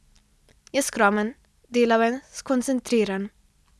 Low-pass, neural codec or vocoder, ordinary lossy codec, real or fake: none; none; none; real